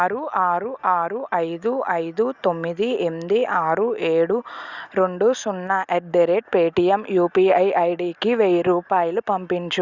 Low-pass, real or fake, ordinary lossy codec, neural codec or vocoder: 7.2 kHz; real; Opus, 64 kbps; none